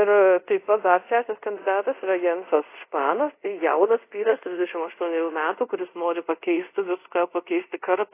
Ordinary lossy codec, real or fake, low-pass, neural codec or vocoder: AAC, 24 kbps; fake; 3.6 kHz; codec, 24 kHz, 0.5 kbps, DualCodec